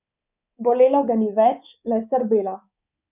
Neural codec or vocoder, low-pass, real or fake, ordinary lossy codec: codec, 24 kHz, 3.1 kbps, DualCodec; 3.6 kHz; fake; none